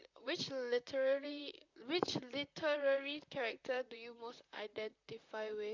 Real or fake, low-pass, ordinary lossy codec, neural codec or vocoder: fake; 7.2 kHz; MP3, 64 kbps; vocoder, 44.1 kHz, 128 mel bands, Pupu-Vocoder